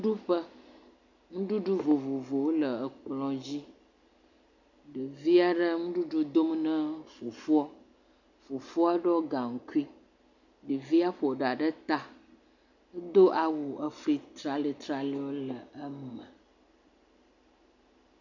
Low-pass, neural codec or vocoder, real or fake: 7.2 kHz; none; real